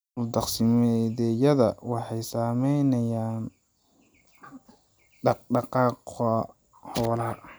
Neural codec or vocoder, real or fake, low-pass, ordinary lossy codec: none; real; none; none